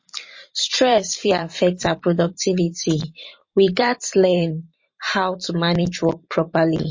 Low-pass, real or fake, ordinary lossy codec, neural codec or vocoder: 7.2 kHz; fake; MP3, 32 kbps; vocoder, 44.1 kHz, 128 mel bands every 512 samples, BigVGAN v2